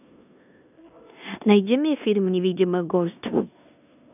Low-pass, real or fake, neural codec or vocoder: 3.6 kHz; fake; codec, 16 kHz in and 24 kHz out, 0.9 kbps, LongCat-Audio-Codec, four codebook decoder